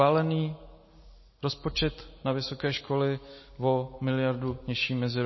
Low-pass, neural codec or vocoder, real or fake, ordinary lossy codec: 7.2 kHz; none; real; MP3, 24 kbps